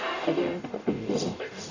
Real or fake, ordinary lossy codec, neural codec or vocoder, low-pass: fake; none; codec, 44.1 kHz, 0.9 kbps, DAC; 7.2 kHz